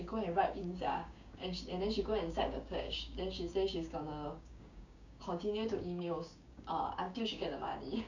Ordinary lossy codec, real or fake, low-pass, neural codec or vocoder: none; fake; 7.2 kHz; codec, 16 kHz, 6 kbps, DAC